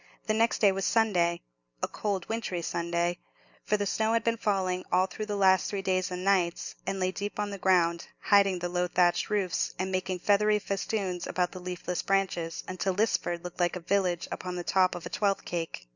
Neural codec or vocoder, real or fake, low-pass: none; real; 7.2 kHz